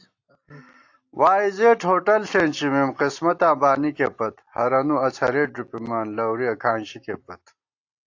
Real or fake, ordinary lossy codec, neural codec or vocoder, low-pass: real; AAC, 48 kbps; none; 7.2 kHz